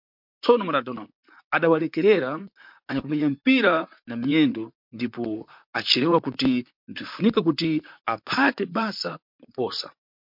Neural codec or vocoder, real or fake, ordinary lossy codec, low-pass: vocoder, 44.1 kHz, 128 mel bands, Pupu-Vocoder; fake; MP3, 48 kbps; 5.4 kHz